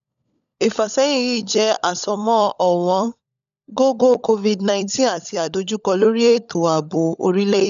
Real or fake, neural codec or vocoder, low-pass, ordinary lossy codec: fake; codec, 16 kHz, 16 kbps, FunCodec, trained on LibriTTS, 50 frames a second; 7.2 kHz; none